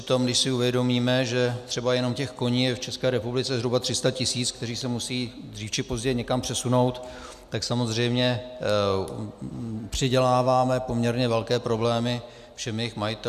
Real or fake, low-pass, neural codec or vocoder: real; 14.4 kHz; none